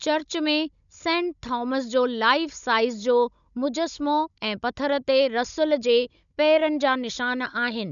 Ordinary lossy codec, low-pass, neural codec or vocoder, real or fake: none; 7.2 kHz; codec, 16 kHz, 16 kbps, FunCodec, trained on Chinese and English, 50 frames a second; fake